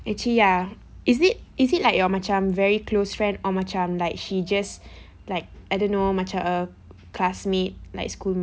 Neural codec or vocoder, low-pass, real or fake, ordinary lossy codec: none; none; real; none